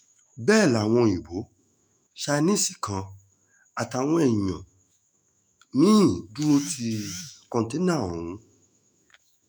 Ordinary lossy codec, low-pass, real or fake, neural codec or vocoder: none; none; fake; autoencoder, 48 kHz, 128 numbers a frame, DAC-VAE, trained on Japanese speech